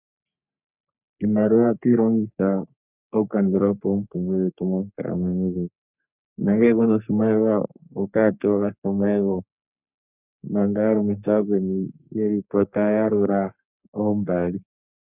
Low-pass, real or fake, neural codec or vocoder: 3.6 kHz; fake; codec, 44.1 kHz, 3.4 kbps, Pupu-Codec